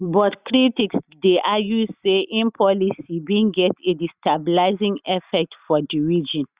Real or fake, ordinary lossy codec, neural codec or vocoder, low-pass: real; Opus, 64 kbps; none; 3.6 kHz